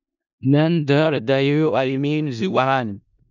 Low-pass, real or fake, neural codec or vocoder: 7.2 kHz; fake; codec, 16 kHz in and 24 kHz out, 0.4 kbps, LongCat-Audio-Codec, four codebook decoder